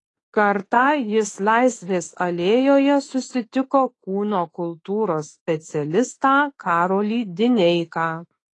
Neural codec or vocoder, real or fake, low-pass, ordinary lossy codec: autoencoder, 48 kHz, 32 numbers a frame, DAC-VAE, trained on Japanese speech; fake; 10.8 kHz; AAC, 32 kbps